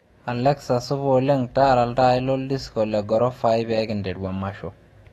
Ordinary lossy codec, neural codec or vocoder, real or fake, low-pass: AAC, 32 kbps; autoencoder, 48 kHz, 128 numbers a frame, DAC-VAE, trained on Japanese speech; fake; 19.8 kHz